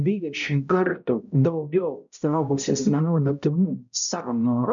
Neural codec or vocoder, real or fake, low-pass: codec, 16 kHz, 0.5 kbps, X-Codec, HuBERT features, trained on balanced general audio; fake; 7.2 kHz